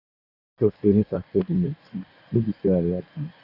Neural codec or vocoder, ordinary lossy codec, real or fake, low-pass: codec, 16 kHz in and 24 kHz out, 1.1 kbps, FireRedTTS-2 codec; none; fake; 5.4 kHz